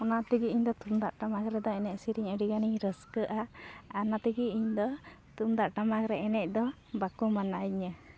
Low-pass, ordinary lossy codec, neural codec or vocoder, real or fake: none; none; none; real